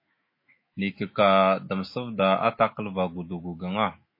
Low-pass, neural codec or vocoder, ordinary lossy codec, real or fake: 5.4 kHz; autoencoder, 48 kHz, 128 numbers a frame, DAC-VAE, trained on Japanese speech; MP3, 24 kbps; fake